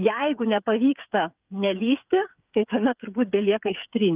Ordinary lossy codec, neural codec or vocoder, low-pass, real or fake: Opus, 24 kbps; codec, 16 kHz, 8 kbps, FreqCodec, smaller model; 3.6 kHz; fake